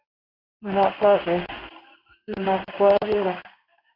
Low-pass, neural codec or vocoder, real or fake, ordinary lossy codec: 5.4 kHz; codec, 16 kHz in and 24 kHz out, 1 kbps, XY-Tokenizer; fake; AAC, 48 kbps